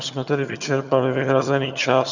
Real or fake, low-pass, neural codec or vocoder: fake; 7.2 kHz; vocoder, 22.05 kHz, 80 mel bands, HiFi-GAN